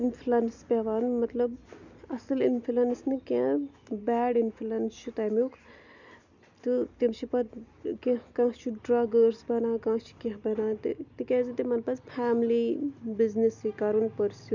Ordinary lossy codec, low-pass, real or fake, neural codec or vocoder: none; 7.2 kHz; real; none